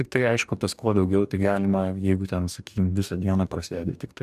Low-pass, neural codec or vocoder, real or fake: 14.4 kHz; codec, 44.1 kHz, 2.6 kbps, DAC; fake